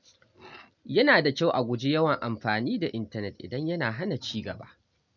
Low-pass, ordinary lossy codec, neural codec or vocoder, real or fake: 7.2 kHz; none; none; real